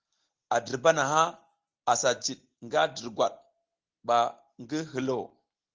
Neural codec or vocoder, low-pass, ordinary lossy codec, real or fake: none; 7.2 kHz; Opus, 16 kbps; real